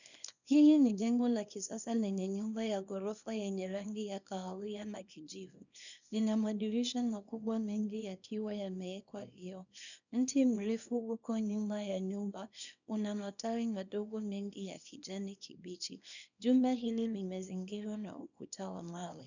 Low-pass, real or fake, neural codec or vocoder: 7.2 kHz; fake; codec, 24 kHz, 0.9 kbps, WavTokenizer, small release